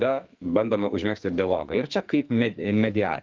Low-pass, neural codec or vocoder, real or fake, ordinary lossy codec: 7.2 kHz; codec, 44.1 kHz, 2.6 kbps, SNAC; fake; Opus, 24 kbps